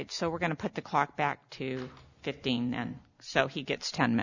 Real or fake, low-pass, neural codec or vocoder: real; 7.2 kHz; none